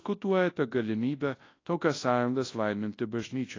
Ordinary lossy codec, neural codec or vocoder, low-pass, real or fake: AAC, 32 kbps; codec, 24 kHz, 0.9 kbps, WavTokenizer, large speech release; 7.2 kHz; fake